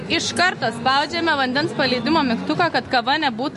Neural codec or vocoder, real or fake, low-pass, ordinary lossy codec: none; real; 14.4 kHz; MP3, 48 kbps